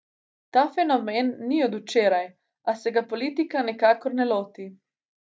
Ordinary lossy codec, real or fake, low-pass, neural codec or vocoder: none; real; none; none